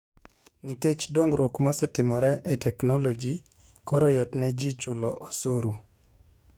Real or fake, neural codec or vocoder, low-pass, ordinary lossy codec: fake; codec, 44.1 kHz, 2.6 kbps, DAC; none; none